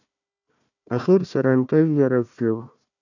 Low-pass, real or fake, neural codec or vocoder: 7.2 kHz; fake; codec, 16 kHz, 1 kbps, FunCodec, trained on Chinese and English, 50 frames a second